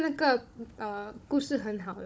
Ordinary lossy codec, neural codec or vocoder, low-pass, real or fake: none; codec, 16 kHz, 16 kbps, FunCodec, trained on Chinese and English, 50 frames a second; none; fake